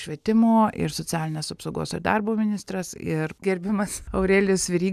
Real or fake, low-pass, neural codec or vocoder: real; 14.4 kHz; none